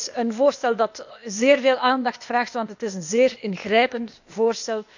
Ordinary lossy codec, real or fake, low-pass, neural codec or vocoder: none; fake; 7.2 kHz; codec, 16 kHz, 0.8 kbps, ZipCodec